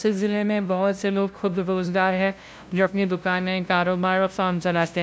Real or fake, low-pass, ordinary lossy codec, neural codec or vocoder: fake; none; none; codec, 16 kHz, 0.5 kbps, FunCodec, trained on LibriTTS, 25 frames a second